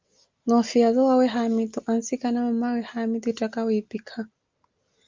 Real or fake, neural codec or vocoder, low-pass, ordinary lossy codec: real; none; 7.2 kHz; Opus, 24 kbps